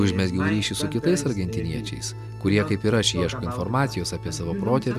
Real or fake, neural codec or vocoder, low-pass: real; none; 14.4 kHz